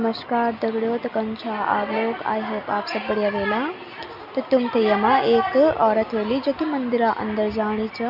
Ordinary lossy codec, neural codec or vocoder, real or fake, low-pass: none; none; real; 5.4 kHz